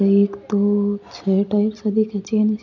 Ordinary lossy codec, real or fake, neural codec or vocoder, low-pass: none; real; none; 7.2 kHz